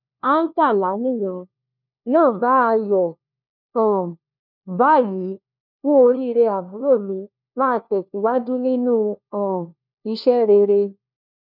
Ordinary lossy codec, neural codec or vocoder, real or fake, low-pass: none; codec, 16 kHz, 1 kbps, FunCodec, trained on LibriTTS, 50 frames a second; fake; 5.4 kHz